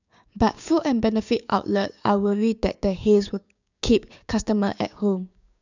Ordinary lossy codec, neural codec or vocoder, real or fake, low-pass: none; codec, 16 kHz, 6 kbps, DAC; fake; 7.2 kHz